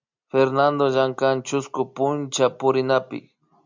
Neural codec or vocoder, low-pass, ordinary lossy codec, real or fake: none; 7.2 kHz; MP3, 64 kbps; real